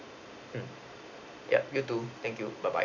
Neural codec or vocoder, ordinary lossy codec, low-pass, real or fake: none; none; 7.2 kHz; real